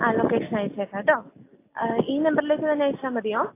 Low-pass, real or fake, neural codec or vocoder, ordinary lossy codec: 3.6 kHz; real; none; AAC, 24 kbps